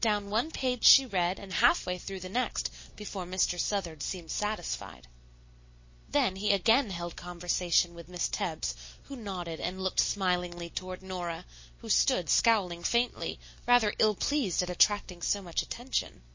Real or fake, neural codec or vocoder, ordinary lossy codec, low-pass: real; none; MP3, 32 kbps; 7.2 kHz